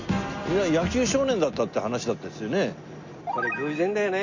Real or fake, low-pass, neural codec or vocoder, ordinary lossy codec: real; 7.2 kHz; none; Opus, 64 kbps